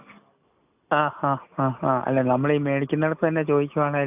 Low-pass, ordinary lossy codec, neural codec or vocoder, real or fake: 3.6 kHz; AAC, 32 kbps; none; real